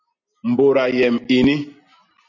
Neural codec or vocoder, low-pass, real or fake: none; 7.2 kHz; real